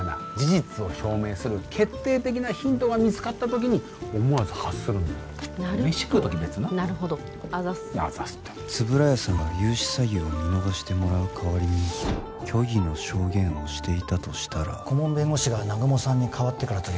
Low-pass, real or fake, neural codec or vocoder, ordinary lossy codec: none; real; none; none